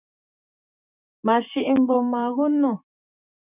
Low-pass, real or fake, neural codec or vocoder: 3.6 kHz; fake; vocoder, 44.1 kHz, 128 mel bands, Pupu-Vocoder